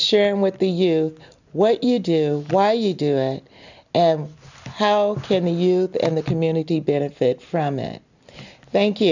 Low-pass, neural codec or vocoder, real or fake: 7.2 kHz; none; real